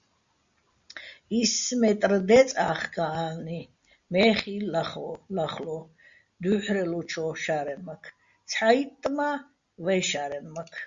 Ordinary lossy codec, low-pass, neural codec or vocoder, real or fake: Opus, 64 kbps; 7.2 kHz; none; real